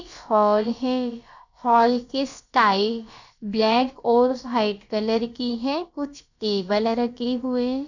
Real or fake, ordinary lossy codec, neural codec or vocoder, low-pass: fake; none; codec, 16 kHz, about 1 kbps, DyCAST, with the encoder's durations; 7.2 kHz